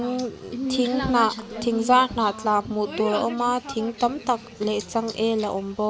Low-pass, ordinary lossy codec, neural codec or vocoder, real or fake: none; none; none; real